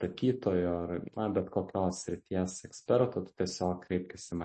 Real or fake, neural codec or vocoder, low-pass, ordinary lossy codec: real; none; 10.8 kHz; MP3, 32 kbps